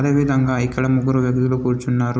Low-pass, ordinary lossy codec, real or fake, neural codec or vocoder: none; none; real; none